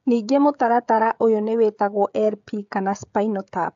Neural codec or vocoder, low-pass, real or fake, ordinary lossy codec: codec, 16 kHz, 16 kbps, FreqCodec, smaller model; 7.2 kHz; fake; AAC, 64 kbps